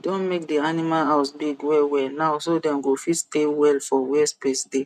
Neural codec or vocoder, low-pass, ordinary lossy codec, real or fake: none; 14.4 kHz; none; real